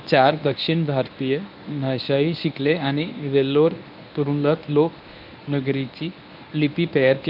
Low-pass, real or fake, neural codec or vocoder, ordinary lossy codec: 5.4 kHz; fake; codec, 24 kHz, 0.9 kbps, WavTokenizer, medium speech release version 1; none